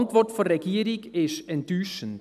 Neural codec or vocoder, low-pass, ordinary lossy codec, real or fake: none; 14.4 kHz; none; real